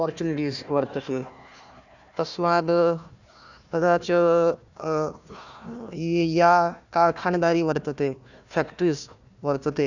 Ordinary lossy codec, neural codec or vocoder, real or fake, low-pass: none; codec, 16 kHz, 1 kbps, FunCodec, trained on Chinese and English, 50 frames a second; fake; 7.2 kHz